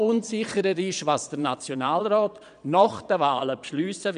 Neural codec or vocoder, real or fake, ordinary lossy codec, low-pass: vocoder, 22.05 kHz, 80 mel bands, Vocos; fake; none; 9.9 kHz